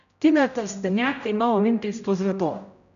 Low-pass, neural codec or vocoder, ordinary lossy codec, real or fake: 7.2 kHz; codec, 16 kHz, 0.5 kbps, X-Codec, HuBERT features, trained on general audio; none; fake